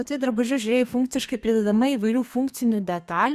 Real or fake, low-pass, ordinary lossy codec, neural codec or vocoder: fake; 14.4 kHz; Opus, 64 kbps; codec, 32 kHz, 1.9 kbps, SNAC